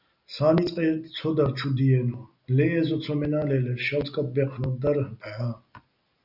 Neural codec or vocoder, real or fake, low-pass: none; real; 5.4 kHz